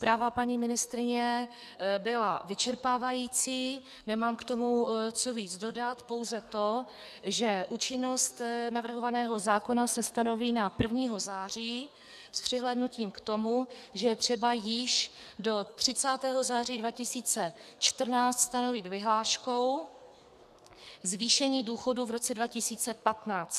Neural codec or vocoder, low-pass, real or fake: codec, 44.1 kHz, 2.6 kbps, SNAC; 14.4 kHz; fake